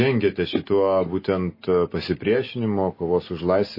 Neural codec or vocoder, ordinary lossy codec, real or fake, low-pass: none; MP3, 24 kbps; real; 5.4 kHz